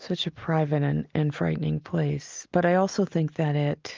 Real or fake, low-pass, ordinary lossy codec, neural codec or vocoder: real; 7.2 kHz; Opus, 32 kbps; none